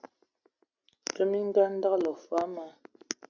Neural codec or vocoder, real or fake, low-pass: none; real; 7.2 kHz